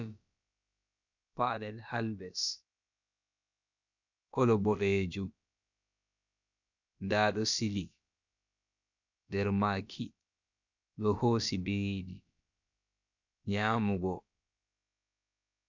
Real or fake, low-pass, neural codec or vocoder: fake; 7.2 kHz; codec, 16 kHz, about 1 kbps, DyCAST, with the encoder's durations